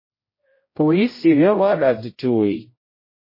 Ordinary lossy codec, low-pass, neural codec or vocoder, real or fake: MP3, 24 kbps; 5.4 kHz; codec, 16 kHz, 0.5 kbps, X-Codec, HuBERT features, trained on general audio; fake